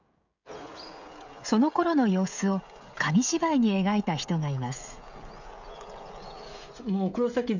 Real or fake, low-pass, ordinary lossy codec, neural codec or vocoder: fake; 7.2 kHz; none; codec, 16 kHz, 16 kbps, FreqCodec, smaller model